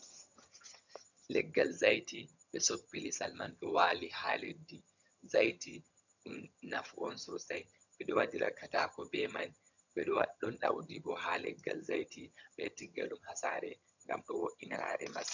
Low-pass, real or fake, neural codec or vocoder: 7.2 kHz; fake; vocoder, 22.05 kHz, 80 mel bands, HiFi-GAN